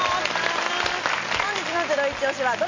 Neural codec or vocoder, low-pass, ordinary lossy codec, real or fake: none; 7.2 kHz; MP3, 32 kbps; real